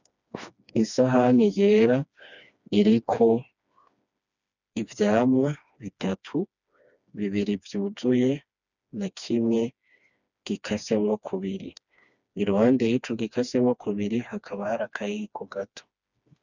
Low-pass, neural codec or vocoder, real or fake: 7.2 kHz; codec, 16 kHz, 2 kbps, FreqCodec, smaller model; fake